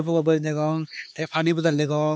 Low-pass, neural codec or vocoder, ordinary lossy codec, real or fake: none; codec, 16 kHz, 2 kbps, X-Codec, HuBERT features, trained on LibriSpeech; none; fake